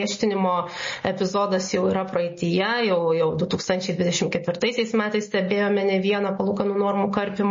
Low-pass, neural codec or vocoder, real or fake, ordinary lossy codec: 7.2 kHz; none; real; MP3, 32 kbps